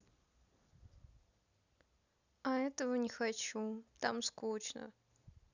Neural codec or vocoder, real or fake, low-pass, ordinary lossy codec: none; real; 7.2 kHz; none